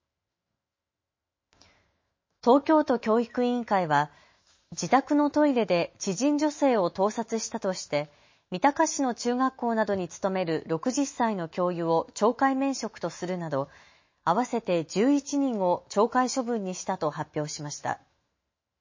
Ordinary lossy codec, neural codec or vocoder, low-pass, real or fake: MP3, 32 kbps; autoencoder, 48 kHz, 128 numbers a frame, DAC-VAE, trained on Japanese speech; 7.2 kHz; fake